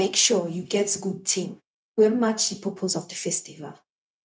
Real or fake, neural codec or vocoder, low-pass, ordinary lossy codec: fake; codec, 16 kHz, 0.4 kbps, LongCat-Audio-Codec; none; none